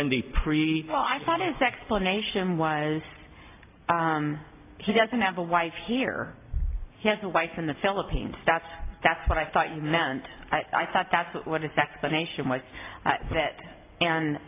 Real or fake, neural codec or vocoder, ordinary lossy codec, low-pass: real; none; AAC, 24 kbps; 3.6 kHz